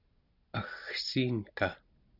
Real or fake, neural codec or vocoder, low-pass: real; none; 5.4 kHz